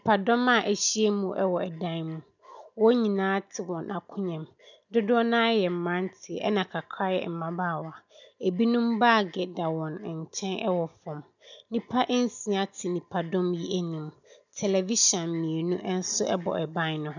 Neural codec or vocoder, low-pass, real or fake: none; 7.2 kHz; real